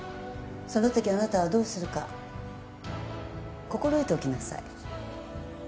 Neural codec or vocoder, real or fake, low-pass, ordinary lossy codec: none; real; none; none